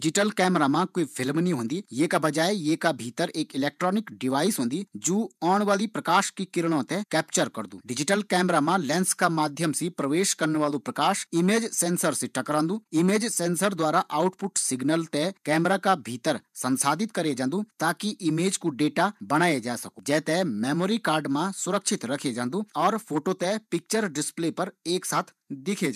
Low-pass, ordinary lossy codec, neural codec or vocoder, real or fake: 14.4 kHz; none; vocoder, 48 kHz, 128 mel bands, Vocos; fake